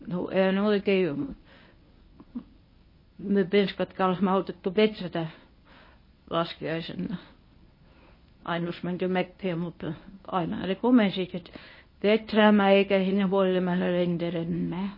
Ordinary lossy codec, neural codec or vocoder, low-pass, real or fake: MP3, 24 kbps; codec, 24 kHz, 0.9 kbps, WavTokenizer, medium speech release version 1; 5.4 kHz; fake